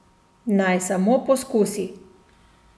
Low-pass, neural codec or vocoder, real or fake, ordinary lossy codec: none; none; real; none